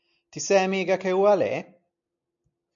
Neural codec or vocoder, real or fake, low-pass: none; real; 7.2 kHz